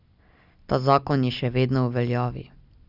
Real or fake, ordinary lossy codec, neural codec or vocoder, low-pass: real; none; none; 5.4 kHz